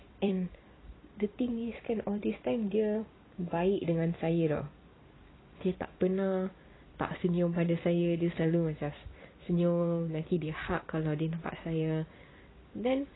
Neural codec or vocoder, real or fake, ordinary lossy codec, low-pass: vocoder, 44.1 kHz, 128 mel bands every 256 samples, BigVGAN v2; fake; AAC, 16 kbps; 7.2 kHz